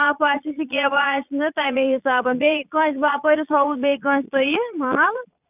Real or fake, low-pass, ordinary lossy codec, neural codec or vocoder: fake; 3.6 kHz; none; vocoder, 22.05 kHz, 80 mel bands, Vocos